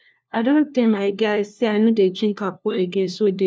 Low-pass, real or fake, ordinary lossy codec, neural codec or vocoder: none; fake; none; codec, 16 kHz, 1 kbps, FunCodec, trained on LibriTTS, 50 frames a second